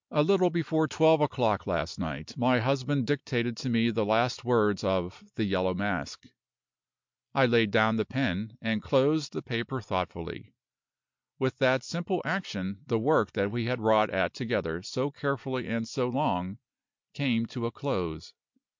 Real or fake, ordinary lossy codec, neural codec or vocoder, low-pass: real; MP3, 64 kbps; none; 7.2 kHz